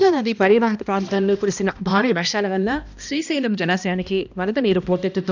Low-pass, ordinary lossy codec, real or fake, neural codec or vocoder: 7.2 kHz; none; fake; codec, 16 kHz, 1 kbps, X-Codec, HuBERT features, trained on balanced general audio